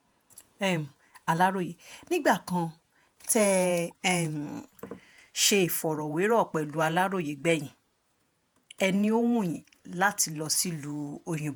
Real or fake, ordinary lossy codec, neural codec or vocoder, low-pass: fake; none; vocoder, 48 kHz, 128 mel bands, Vocos; none